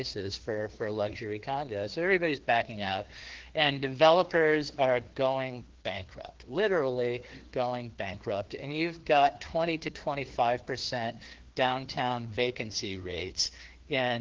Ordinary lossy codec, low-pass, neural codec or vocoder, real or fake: Opus, 16 kbps; 7.2 kHz; codec, 16 kHz, 2 kbps, FreqCodec, larger model; fake